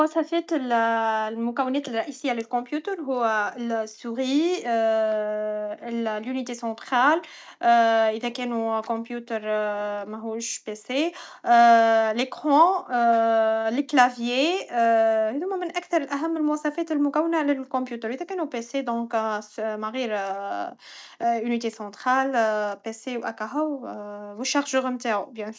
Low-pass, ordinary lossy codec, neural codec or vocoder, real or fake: none; none; none; real